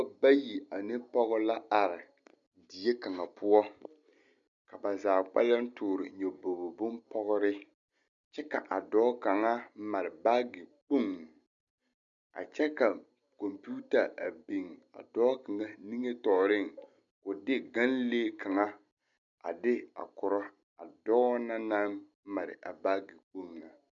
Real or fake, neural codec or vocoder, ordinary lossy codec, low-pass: real; none; MP3, 96 kbps; 7.2 kHz